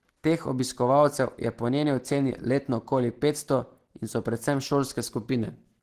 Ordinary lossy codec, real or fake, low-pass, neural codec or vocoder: Opus, 16 kbps; real; 14.4 kHz; none